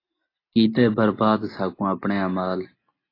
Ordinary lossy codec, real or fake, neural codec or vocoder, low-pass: AAC, 24 kbps; real; none; 5.4 kHz